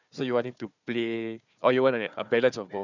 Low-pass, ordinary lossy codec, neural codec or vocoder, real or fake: 7.2 kHz; none; codec, 16 kHz, 4 kbps, FunCodec, trained on Chinese and English, 50 frames a second; fake